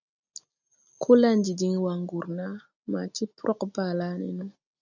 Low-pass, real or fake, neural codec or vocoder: 7.2 kHz; real; none